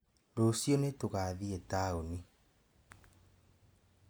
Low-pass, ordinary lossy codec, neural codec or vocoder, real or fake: none; none; none; real